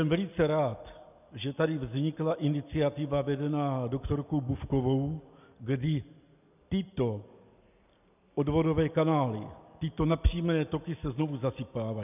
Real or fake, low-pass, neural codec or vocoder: real; 3.6 kHz; none